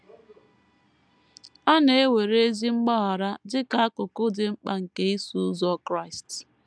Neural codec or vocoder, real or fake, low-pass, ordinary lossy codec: none; real; none; none